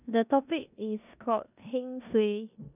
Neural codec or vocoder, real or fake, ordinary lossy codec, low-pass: codec, 16 kHz in and 24 kHz out, 0.9 kbps, LongCat-Audio-Codec, four codebook decoder; fake; none; 3.6 kHz